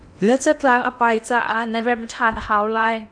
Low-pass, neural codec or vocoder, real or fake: 9.9 kHz; codec, 16 kHz in and 24 kHz out, 0.8 kbps, FocalCodec, streaming, 65536 codes; fake